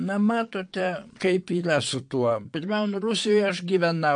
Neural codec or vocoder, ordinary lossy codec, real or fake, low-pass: vocoder, 22.05 kHz, 80 mel bands, Vocos; MP3, 64 kbps; fake; 9.9 kHz